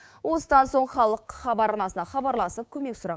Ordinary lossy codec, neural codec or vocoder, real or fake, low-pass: none; codec, 16 kHz, 6 kbps, DAC; fake; none